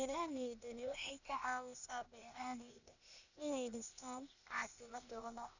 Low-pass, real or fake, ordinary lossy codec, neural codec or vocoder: 7.2 kHz; fake; none; codec, 16 kHz, 0.8 kbps, ZipCodec